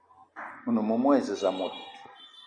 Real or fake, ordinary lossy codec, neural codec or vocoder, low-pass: real; AAC, 64 kbps; none; 9.9 kHz